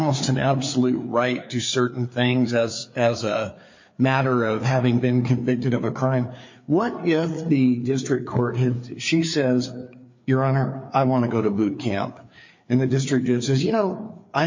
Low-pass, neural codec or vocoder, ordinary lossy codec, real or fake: 7.2 kHz; codec, 16 kHz, 4 kbps, FreqCodec, larger model; MP3, 48 kbps; fake